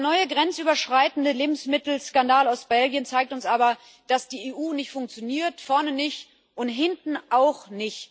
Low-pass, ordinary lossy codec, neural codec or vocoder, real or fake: none; none; none; real